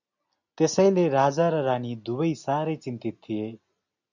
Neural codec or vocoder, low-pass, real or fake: none; 7.2 kHz; real